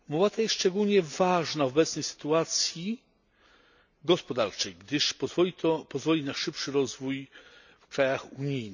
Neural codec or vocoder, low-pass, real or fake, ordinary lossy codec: none; 7.2 kHz; real; none